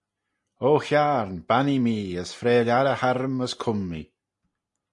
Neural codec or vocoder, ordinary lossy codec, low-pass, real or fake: none; MP3, 48 kbps; 10.8 kHz; real